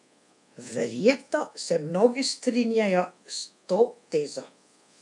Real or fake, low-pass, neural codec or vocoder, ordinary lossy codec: fake; 10.8 kHz; codec, 24 kHz, 1.2 kbps, DualCodec; none